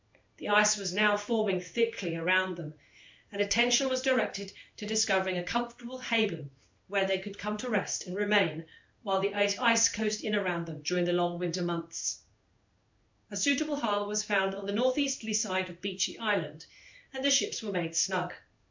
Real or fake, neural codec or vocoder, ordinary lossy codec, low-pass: fake; codec, 16 kHz in and 24 kHz out, 1 kbps, XY-Tokenizer; MP3, 64 kbps; 7.2 kHz